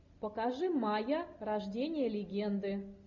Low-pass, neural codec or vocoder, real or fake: 7.2 kHz; none; real